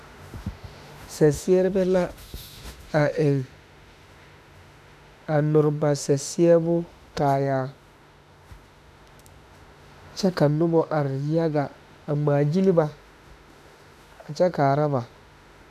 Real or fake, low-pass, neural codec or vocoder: fake; 14.4 kHz; autoencoder, 48 kHz, 32 numbers a frame, DAC-VAE, trained on Japanese speech